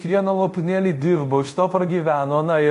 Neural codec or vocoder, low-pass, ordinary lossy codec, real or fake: codec, 24 kHz, 0.5 kbps, DualCodec; 10.8 kHz; MP3, 48 kbps; fake